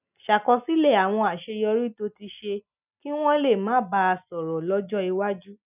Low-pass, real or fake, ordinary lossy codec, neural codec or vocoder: 3.6 kHz; real; none; none